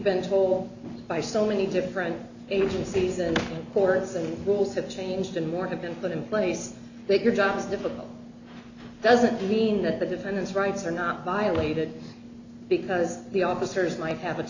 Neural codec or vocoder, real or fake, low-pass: none; real; 7.2 kHz